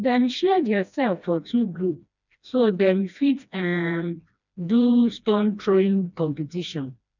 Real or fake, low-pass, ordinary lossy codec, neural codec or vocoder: fake; 7.2 kHz; none; codec, 16 kHz, 1 kbps, FreqCodec, smaller model